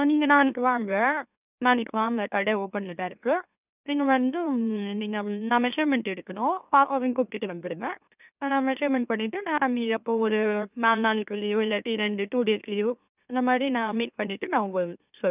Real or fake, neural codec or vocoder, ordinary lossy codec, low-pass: fake; autoencoder, 44.1 kHz, a latent of 192 numbers a frame, MeloTTS; none; 3.6 kHz